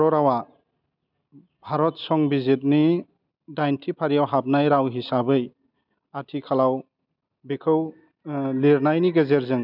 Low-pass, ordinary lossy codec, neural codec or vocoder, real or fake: 5.4 kHz; none; none; real